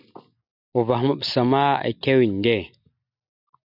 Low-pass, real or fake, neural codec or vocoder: 5.4 kHz; real; none